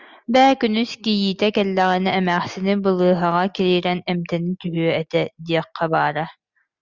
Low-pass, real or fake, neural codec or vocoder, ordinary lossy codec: 7.2 kHz; real; none; Opus, 64 kbps